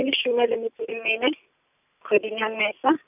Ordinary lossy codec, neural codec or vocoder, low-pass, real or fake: none; none; 3.6 kHz; real